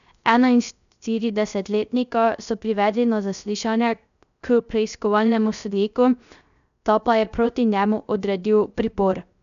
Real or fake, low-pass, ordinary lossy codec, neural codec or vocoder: fake; 7.2 kHz; none; codec, 16 kHz, 0.3 kbps, FocalCodec